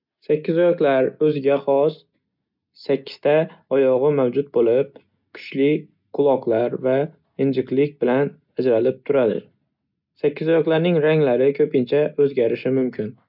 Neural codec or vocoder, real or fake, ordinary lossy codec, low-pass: none; real; none; 5.4 kHz